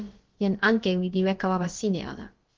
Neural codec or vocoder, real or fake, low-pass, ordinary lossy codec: codec, 16 kHz, about 1 kbps, DyCAST, with the encoder's durations; fake; 7.2 kHz; Opus, 16 kbps